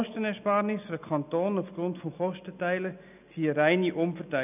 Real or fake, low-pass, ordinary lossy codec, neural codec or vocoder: real; 3.6 kHz; none; none